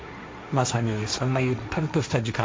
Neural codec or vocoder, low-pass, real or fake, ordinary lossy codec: codec, 16 kHz, 1.1 kbps, Voila-Tokenizer; none; fake; none